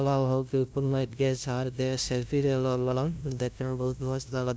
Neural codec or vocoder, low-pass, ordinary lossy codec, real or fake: codec, 16 kHz, 0.5 kbps, FunCodec, trained on LibriTTS, 25 frames a second; none; none; fake